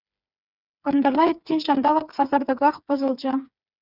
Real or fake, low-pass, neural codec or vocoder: fake; 5.4 kHz; codec, 16 kHz, 4 kbps, FreqCodec, smaller model